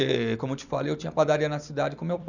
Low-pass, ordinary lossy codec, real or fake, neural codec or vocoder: 7.2 kHz; none; fake; vocoder, 44.1 kHz, 128 mel bands every 256 samples, BigVGAN v2